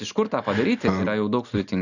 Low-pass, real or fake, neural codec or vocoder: 7.2 kHz; real; none